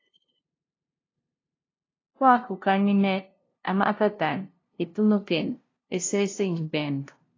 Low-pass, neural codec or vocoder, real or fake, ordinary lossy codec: 7.2 kHz; codec, 16 kHz, 0.5 kbps, FunCodec, trained on LibriTTS, 25 frames a second; fake; AAC, 32 kbps